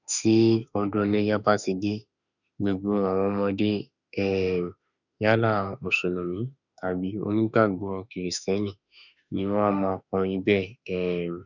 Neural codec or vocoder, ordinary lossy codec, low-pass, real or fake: autoencoder, 48 kHz, 32 numbers a frame, DAC-VAE, trained on Japanese speech; none; 7.2 kHz; fake